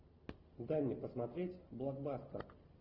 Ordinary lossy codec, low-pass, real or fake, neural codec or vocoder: Opus, 24 kbps; 5.4 kHz; real; none